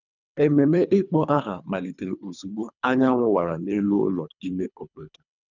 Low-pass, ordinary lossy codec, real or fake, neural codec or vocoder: 7.2 kHz; none; fake; codec, 24 kHz, 3 kbps, HILCodec